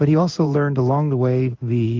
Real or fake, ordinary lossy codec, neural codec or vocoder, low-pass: fake; Opus, 32 kbps; codec, 16 kHz in and 24 kHz out, 1 kbps, XY-Tokenizer; 7.2 kHz